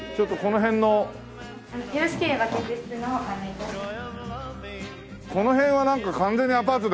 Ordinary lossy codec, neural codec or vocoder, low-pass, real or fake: none; none; none; real